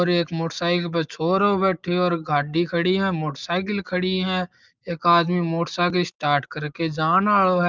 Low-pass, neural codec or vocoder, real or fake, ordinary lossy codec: 7.2 kHz; none; real; Opus, 24 kbps